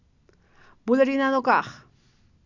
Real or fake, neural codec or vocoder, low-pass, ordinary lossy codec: real; none; 7.2 kHz; none